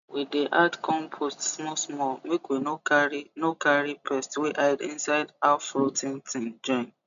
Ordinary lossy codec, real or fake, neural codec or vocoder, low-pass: none; real; none; 7.2 kHz